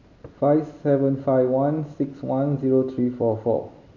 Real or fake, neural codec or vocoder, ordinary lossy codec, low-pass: real; none; none; 7.2 kHz